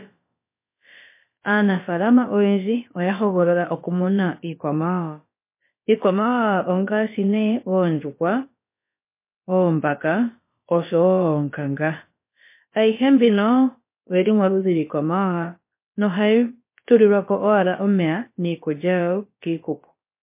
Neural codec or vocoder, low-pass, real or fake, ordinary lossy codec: codec, 16 kHz, about 1 kbps, DyCAST, with the encoder's durations; 3.6 kHz; fake; MP3, 24 kbps